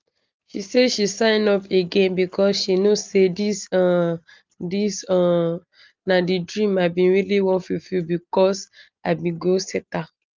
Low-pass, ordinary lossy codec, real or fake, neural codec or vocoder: 7.2 kHz; Opus, 32 kbps; real; none